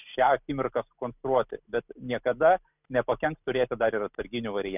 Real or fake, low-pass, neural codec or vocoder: real; 3.6 kHz; none